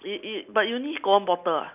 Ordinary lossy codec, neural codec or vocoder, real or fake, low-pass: none; none; real; 3.6 kHz